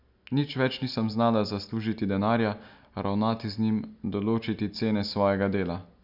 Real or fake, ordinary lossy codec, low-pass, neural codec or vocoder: real; none; 5.4 kHz; none